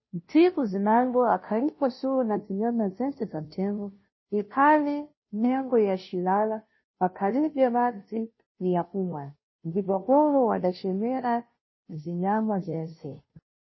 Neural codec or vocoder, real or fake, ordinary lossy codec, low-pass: codec, 16 kHz, 0.5 kbps, FunCodec, trained on Chinese and English, 25 frames a second; fake; MP3, 24 kbps; 7.2 kHz